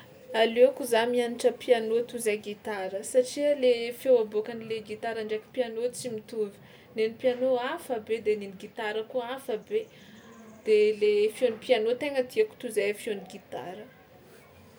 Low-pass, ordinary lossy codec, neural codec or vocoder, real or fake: none; none; none; real